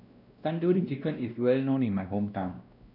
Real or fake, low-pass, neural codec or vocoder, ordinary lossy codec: fake; 5.4 kHz; codec, 16 kHz, 1 kbps, X-Codec, WavLM features, trained on Multilingual LibriSpeech; none